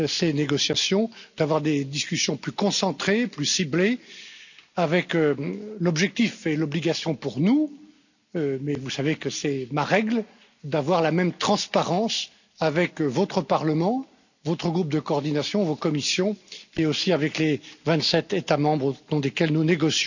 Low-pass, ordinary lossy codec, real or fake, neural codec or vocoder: 7.2 kHz; none; real; none